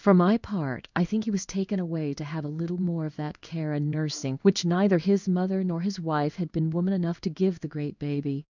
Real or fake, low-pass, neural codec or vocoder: fake; 7.2 kHz; codec, 16 kHz in and 24 kHz out, 1 kbps, XY-Tokenizer